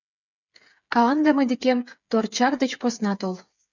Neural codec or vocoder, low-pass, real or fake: codec, 16 kHz, 8 kbps, FreqCodec, smaller model; 7.2 kHz; fake